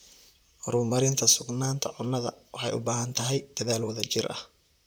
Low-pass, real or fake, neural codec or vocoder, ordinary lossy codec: none; fake; vocoder, 44.1 kHz, 128 mel bands, Pupu-Vocoder; none